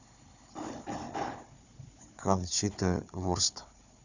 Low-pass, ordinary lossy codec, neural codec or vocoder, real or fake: 7.2 kHz; none; codec, 16 kHz, 4 kbps, FunCodec, trained on Chinese and English, 50 frames a second; fake